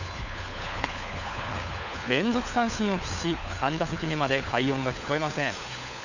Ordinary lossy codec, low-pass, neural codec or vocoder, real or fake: none; 7.2 kHz; codec, 16 kHz, 4 kbps, FunCodec, trained on LibriTTS, 50 frames a second; fake